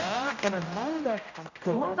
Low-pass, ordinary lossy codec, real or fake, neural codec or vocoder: 7.2 kHz; none; fake; codec, 16 kHz, 0.5 kbps, X-Codec, HuBERT features, trained on general audio